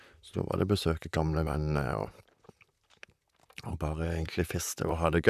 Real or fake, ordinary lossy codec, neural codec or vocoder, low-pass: fake; none; vocoder, 44.1 kHz, 128 mel bands, Pupu-Vocoder; 14.4 kHz